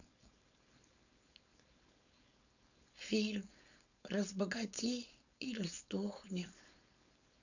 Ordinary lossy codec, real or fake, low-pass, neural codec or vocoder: none; fake; 7.2 kHz; codec, 16 kHz, 4.8 kbps, FACodec